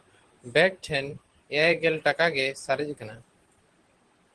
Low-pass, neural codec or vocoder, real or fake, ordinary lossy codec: 10.8 kHz; vocoder, 44.1 kHz, 128 mel bands every 512 samples, BigVGAN v2; fake; Opus, 16 kbps